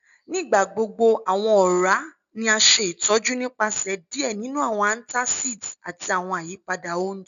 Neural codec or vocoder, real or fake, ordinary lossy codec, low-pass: none; real; none; 7.2 kHz